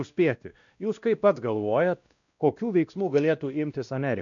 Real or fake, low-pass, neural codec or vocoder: fake; 7.2 kHz; codec, 16 kHz, 1 kbps, X-Codec, WavLM features, trained on Multilingual LibriSpeech